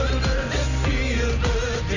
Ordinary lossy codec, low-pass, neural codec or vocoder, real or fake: none; 7.2 kHz; none; real